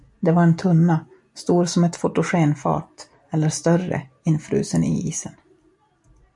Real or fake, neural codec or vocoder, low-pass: real; none; 10.8 kHz